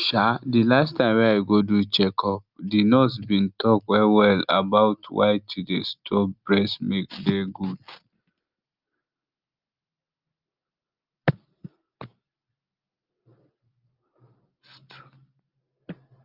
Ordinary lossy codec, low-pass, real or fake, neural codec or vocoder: Opus, 24 kbps; 5.4 kHz; real; none